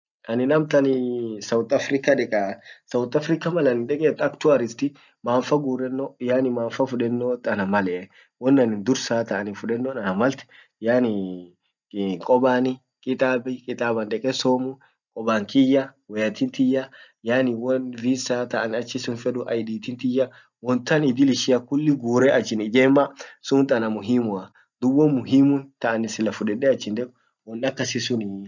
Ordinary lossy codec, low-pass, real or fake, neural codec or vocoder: none; 7.2 kHz; real; none